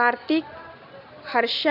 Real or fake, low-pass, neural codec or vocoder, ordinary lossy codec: real; 5.4 kHz; none; none